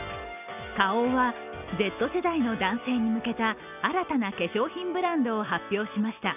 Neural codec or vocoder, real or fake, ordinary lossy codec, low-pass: none; real; none; 3.6 kHz